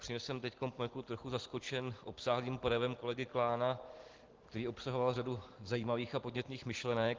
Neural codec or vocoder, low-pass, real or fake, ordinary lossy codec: none; 7.2 kHz; real; Opus, 16 kbps